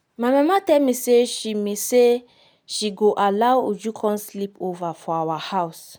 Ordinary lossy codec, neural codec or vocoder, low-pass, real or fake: none; none; none; real